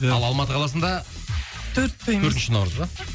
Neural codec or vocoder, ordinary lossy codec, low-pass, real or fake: none; none; none; real